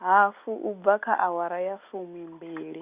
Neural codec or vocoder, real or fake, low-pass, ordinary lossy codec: none; real; 3.6 kHz; Opus, 64 kbps